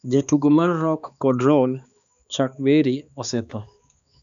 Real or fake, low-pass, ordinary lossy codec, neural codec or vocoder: fake; 7.2 kHz; none; codec, 16 kHz, 2 kbps, X-Codec, HuBERT features, trained on balanced general audio